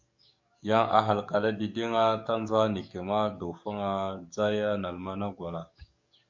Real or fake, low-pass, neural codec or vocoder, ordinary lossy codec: fake; 7.2 kHz; codec, 44.1 kHz, 7.8 kbps, DAC; MP3, 64 kbps